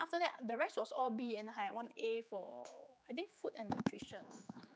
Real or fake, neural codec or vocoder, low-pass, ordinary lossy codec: fake; codec, 16 kHz, 4 kbps, X-Codec, HuBERT features, trained on general audio; none; none